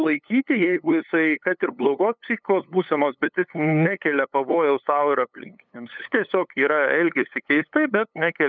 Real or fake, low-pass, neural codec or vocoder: fake; 7.2 kHz; codec, 16 kHz, 8 kbps, FunCodec, trained on LibriTTS, 25 frames a second